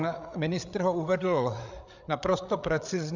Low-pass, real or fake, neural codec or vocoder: 7.2 kHz; fake; codec, 16 kHz, 8 kbps, FreqCodec, larger model